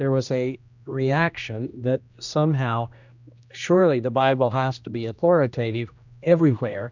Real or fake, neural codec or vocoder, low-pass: fake; codec, 16 kHz, 1 kbps, X-Codec, HuBERT features, trained on general audio; 7.2 kHz